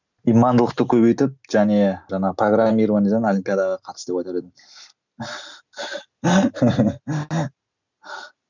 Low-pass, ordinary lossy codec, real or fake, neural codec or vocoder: 7.2 kHz; none; real; none